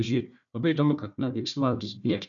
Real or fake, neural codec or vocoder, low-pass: fake; codec, 16 kHz, 1 kbps, FunCodec, trained on Chinese and English, 50 frames a second; 7.2 kHz